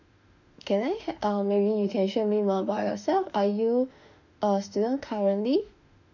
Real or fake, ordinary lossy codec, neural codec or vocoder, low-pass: fake; none; autoencoder, 48 kHz, 32 numbers a frame, DAC-VAE, trained on Japanese speech; 7.2 kHz